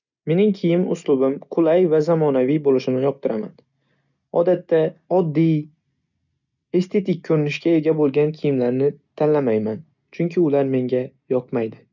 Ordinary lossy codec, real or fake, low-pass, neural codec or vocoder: none; real; 7.2 kHz; none